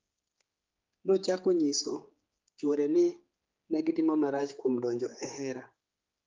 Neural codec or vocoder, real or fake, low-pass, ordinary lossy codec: codec, 16 kHz, 4 kbps, X-Codec, HuBERT features, trained on general audio; fake; 7.2 kHz; Opus, 32 kbps